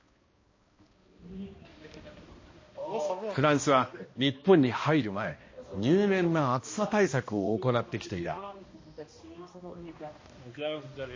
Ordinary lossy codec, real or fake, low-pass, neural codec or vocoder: MP3, 32 kbps; fake; 7.2 kHz; codec, 16 kHz, 1 kbps, X-Codec, HuBERT features, trained on balanced general audio